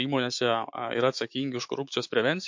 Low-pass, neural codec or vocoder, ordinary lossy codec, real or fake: 7.2 kHz; autoencoder, 48 kHz, 128 numbers a frame, DAC-VAE, trained on Japanese speech; MP3, 48 kbps; fake